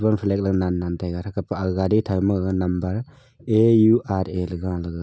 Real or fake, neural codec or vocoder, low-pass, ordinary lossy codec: real; none; none; none